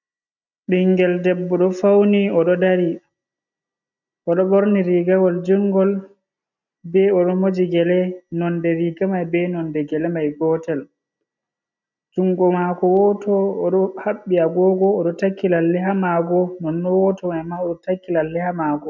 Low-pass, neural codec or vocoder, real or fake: 7.2 kHz; none; real